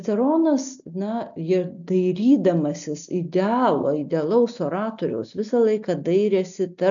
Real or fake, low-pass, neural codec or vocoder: real; 7.2 kHz; none